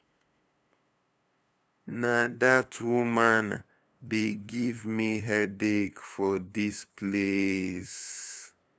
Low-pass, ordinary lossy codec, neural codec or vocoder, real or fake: none; none; codec, 16 kHz, 2 kbps, FunCodec, trained on LibriTTS, 25 frames a second; fake